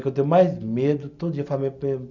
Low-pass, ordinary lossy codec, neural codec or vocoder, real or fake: 7.2 kHz; none; none; real